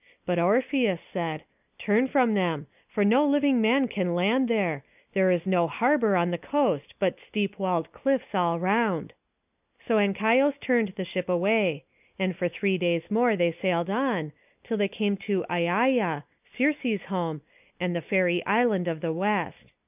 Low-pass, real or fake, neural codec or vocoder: 3.6 kHz; real; none